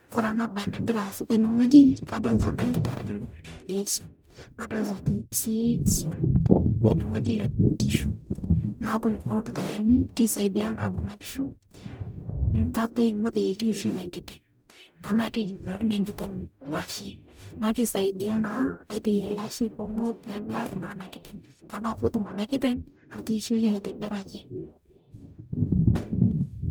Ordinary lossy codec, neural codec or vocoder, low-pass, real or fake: none; codec, 44.1 kHz, 0.9 kbps, DAC; none; fake